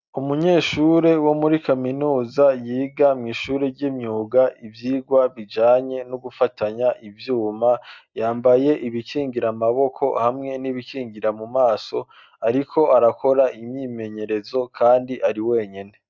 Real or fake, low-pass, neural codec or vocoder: real; 7.2 kHz; none